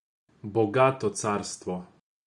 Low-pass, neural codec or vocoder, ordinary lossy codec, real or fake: 10.8 kHz; none; Opus, 64 kbps; real